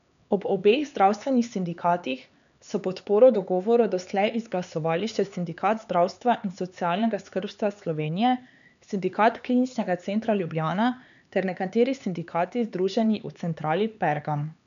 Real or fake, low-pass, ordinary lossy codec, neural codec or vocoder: fake; 7.2 kHz; none; codec, 16 kHz, 4 kbps, X-Codec, HuBERT features, trained on LibriSpeech